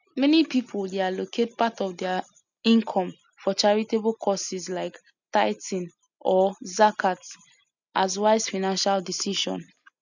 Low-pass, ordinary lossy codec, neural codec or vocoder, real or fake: 7.2 kHz; none; none; real